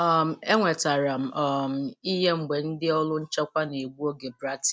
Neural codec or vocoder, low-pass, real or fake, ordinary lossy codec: none; none; real; none